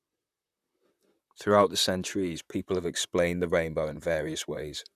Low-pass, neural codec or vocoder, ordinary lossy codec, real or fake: 14.4 kHz; vocoder, 44.1 kHz, 128 mel bands, Pupu-Vocoder; none; fake